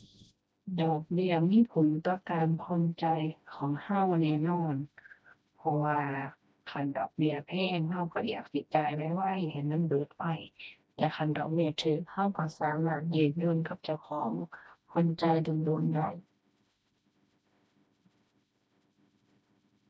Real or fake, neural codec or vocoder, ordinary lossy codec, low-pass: fake; codec, 16 kHz, 1 kbps, FreqCodec, smaller model; none; none